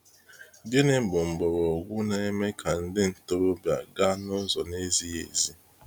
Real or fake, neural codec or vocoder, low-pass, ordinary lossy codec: real; none; none; none